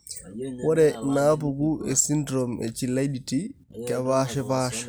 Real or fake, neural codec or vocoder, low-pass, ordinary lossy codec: real; none; none; none